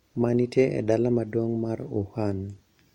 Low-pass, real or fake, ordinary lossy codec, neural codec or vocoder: 19.8 kHz; real; MP3, 64 kbps; none